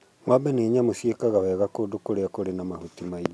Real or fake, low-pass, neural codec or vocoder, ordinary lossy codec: real; none; none; none